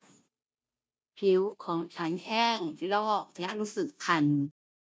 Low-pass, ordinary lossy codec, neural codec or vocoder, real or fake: none; none; codec, 16 kHz, 0.5 kbps, FunCodec, trained on Chinese and English, 25 frames a second; fake